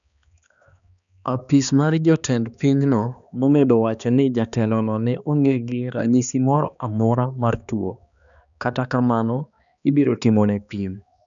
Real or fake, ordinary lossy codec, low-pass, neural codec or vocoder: fake; none; 7.2 kHz; codec, 16 kHz, 2 kbps, X-Codec, HuBERT features, trained on balanced general audio